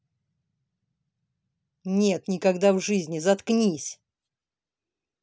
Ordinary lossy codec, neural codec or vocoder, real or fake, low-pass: none; none; real; none